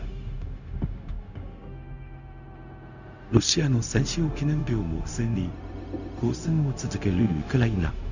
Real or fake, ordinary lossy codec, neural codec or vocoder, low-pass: fake; none; codec, 16 kHz, 0.4 kbps, LongCat-Audio-Codec; 7.2 kHz